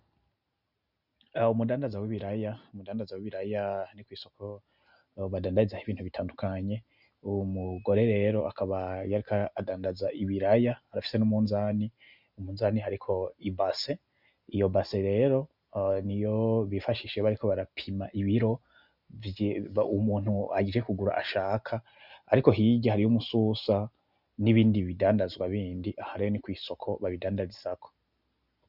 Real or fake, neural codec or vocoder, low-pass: real; none; 5.4 kHz